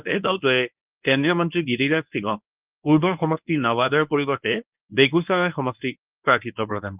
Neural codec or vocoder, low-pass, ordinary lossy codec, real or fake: codec, 24 kHz, 0.9 kbps, WavTokenizer, medium speech release version 1; 3.6 kHz; Opus, 24 kbps; fake